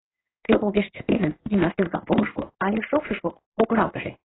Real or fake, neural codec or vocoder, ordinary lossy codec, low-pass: fake; codec, 16 kHz in and 24 kHz out, 1 kbps, XY-Tokenizer; AAC, 16 kbps; 7.2 kHz